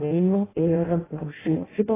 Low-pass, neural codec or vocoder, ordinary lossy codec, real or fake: 3.6 kHz; codec, 16 kHz in and 24 kHz out, 0.6 kbps, FireRedTTS-2 codec; AAC, 16 kbps; fake